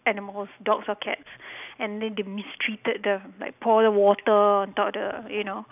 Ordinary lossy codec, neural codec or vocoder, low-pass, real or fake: none; none; 3.6 kHz; real